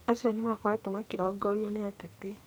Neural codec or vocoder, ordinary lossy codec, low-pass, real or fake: codec, 44.1 kHz, 2.6 kbps, SNAC; none; none; fake